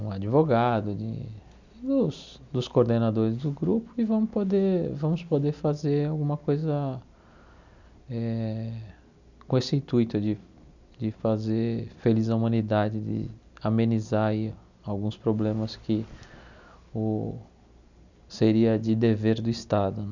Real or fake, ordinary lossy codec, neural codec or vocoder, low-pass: real; none; none; 7.2 kHz